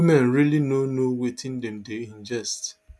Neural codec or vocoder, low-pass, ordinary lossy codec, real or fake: none; none; none; real